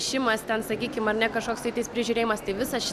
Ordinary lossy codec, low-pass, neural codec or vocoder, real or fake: MP3, 96 kbps; 14.4 kHz; none; real